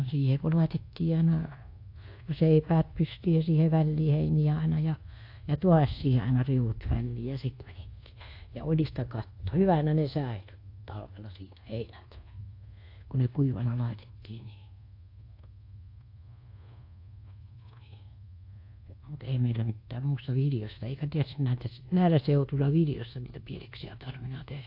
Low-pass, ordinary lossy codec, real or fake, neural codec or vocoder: 5.4 kHz; AAC, 32 kbps; fake; codec, 24 kHz, 1.2 kbps, DualCodec